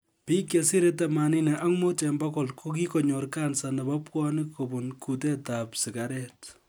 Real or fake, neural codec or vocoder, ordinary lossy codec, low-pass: real; none; none; none